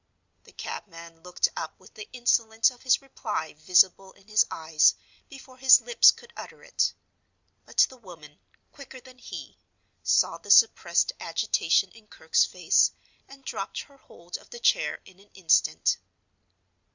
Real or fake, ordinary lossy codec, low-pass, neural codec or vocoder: real; Opus, 64 kbps; 7.2 kHz; none